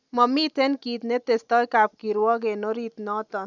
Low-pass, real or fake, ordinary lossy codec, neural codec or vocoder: 7.2 kHz; real; none; none